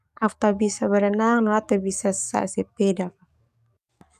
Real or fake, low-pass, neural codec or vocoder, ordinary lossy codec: fake; 14.4 kHz; codec, 44.1 kHz, 7.8 kbps, DAC; none